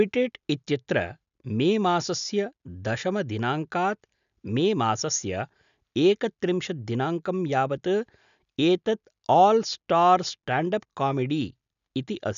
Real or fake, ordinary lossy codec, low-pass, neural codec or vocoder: real; none; 7.2 kHz; none